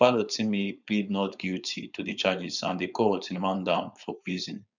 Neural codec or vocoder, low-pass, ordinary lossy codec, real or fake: codec, 16 kHz, 4.8 kbps, FACodec; 7.2 kHz; none; fake